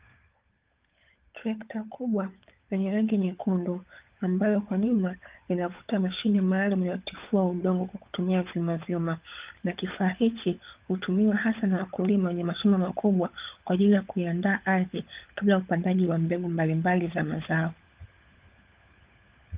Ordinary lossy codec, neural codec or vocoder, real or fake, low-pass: Opus, 24 kbps; codec, 16 kHz, 16 kbps, FunCodec, trained on LibriTTS, 50 frames a second; fake; 3.6 kHz